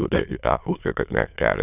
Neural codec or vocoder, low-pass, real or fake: autoencoder, 22.05 kHz, a latent of 192 numbers a frame, VITS, trained on many speakers; 3.6 kHz; fake